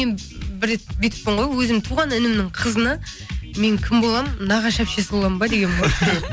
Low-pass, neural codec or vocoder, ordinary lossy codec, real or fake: none; none; none; real